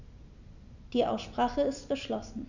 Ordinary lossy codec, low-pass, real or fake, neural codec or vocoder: none; 7.2 kHz; real; none